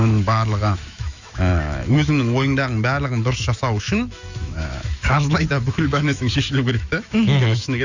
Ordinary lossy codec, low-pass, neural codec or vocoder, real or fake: Opus, 64 kbps; 7.2 kHz; autoencoder, 48 kHz, 128 numbers a frame, DAC-VAE, trained on Japanese speech; fake